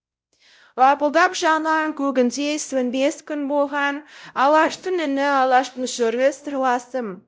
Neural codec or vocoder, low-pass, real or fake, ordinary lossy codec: codec, 16 kHz, 0.5 kbps, X-Codec, WavLM features, trained on Multilingual LibriSpeech; none; fake; none